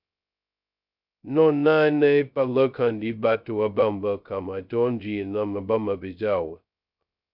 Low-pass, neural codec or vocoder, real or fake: 5.4 kHz; codec, 16 kHz, 0.2 kbps, FocalCodec; fake